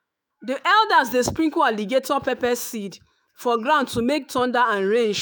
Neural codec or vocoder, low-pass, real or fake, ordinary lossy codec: autoencoder, 48 kHz, 128 numbers a frame, DAC-VAE, trained on Japanese speech; none; fake; none